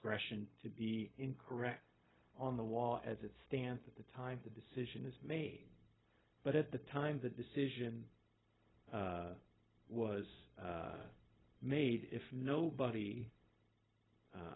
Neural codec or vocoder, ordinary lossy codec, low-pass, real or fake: codec, 16 kHz, 0.4 kbps, LongCat-Audio-Codec; AAC, 16 kbps; 7.2 kHz; fake